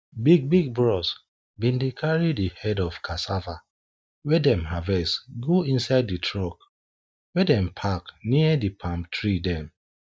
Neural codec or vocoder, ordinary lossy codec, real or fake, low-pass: none; none; real; none